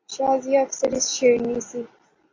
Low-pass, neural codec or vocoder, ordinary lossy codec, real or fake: 7.2 kHz; none; AAC, 32 kbps; real